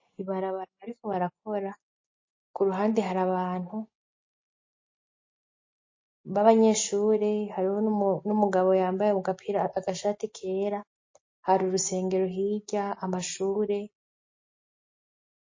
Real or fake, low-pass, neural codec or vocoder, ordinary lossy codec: real; 7.2 kHz; none; MP3, 32 kbps